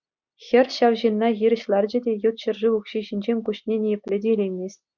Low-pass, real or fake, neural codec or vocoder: 7.2 kHz; real; none